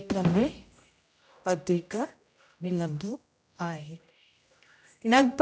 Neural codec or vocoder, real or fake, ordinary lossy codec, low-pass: codec, 16 kHz, 0.5 kbps, X-Codec, HuBERT features, trained on general audio; fake; none; none